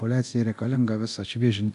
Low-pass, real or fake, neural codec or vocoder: 10.8 kHz; fake; codec, 24 kHz, 0.9 kbps, DualCodec